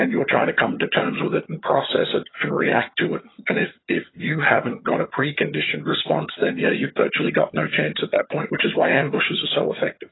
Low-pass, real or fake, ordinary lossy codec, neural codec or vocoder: 7.2 kHz; fake; AAC, 16 kbps; vocoder, 22.05 kHz, 80 mel bands, HiFi-GAN